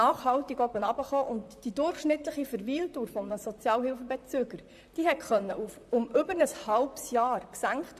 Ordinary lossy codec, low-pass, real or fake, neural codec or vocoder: AAC, 96 kbps; 14.4 kHz; fake; vocoder, 44.1 kHz, 128 mel bands, Pupu-Vocoder